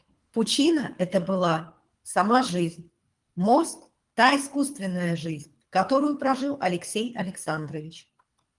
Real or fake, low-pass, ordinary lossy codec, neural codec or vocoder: fake; 10.8 kHz; Opus, 32 kbps; codec, 24 kHz, 3 kbps, HILCodec